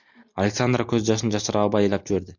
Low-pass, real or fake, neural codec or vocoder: 7.2 kHz; real; none